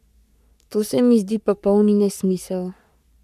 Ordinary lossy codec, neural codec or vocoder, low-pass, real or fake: none; codec, 44.1 kHz, 3.4 kbps, Pupu-Codec; 14.4 kHz; fake